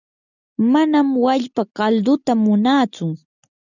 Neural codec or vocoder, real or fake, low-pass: none; real; 7.2 kHz